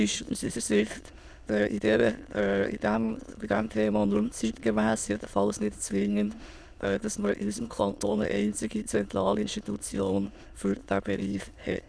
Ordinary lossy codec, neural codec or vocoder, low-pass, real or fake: none; autoencoder, 22.05 kHz, a latent of 192 numbers a frame, VITS, trained on many speakers; none; fake